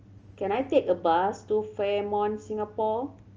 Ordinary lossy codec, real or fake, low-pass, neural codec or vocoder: Opus, 24 kbps; real; 7.2 kHz; none